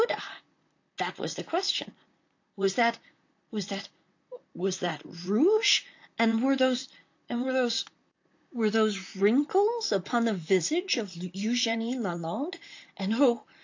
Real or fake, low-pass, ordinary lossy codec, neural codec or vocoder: fake; 7.2 kHz; AAC, 48 kbps; vocoder, 44.1 kHz, 128 mel bands, Pupu-Vocoder